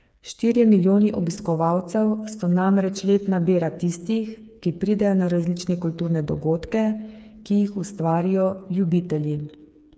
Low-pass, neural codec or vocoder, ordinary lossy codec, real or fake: none; codec, 16 kHz, 4 kbps, FreqCodec, smaller model; none; fake